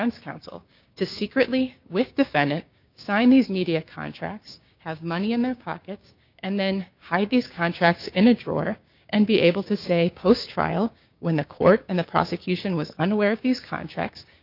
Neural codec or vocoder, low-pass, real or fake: codec, 16 kHz, 6 kbps, DAC; 5.4 kHz; fake